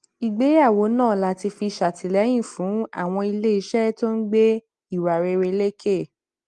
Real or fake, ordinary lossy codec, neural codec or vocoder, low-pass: real; Opus, 24 kbps; none; 10.8 kHz